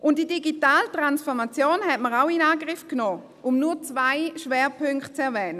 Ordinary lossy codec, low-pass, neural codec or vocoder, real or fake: none; 14.4 kHz; none; real